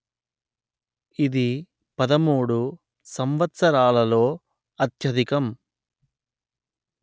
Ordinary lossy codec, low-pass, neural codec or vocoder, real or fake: none; none; none; real